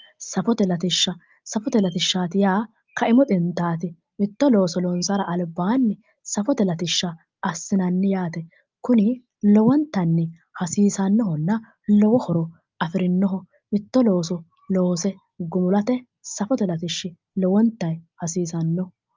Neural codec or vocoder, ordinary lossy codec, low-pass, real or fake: none; Opus, 24 kbps; 7.2 kHz; real